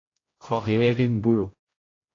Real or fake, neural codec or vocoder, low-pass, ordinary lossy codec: fake; codec, 16 kHz, 0.5 kbps, X-Codec, HuBERT features, trained on general audio; 7.2 kHz; AAC, 32 kbps